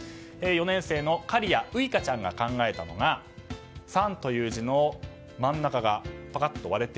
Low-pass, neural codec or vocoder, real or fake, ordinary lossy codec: none; none; real; none